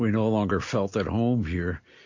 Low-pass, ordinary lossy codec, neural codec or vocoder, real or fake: 7.2 kHz; MP3, 48 kbps; none; real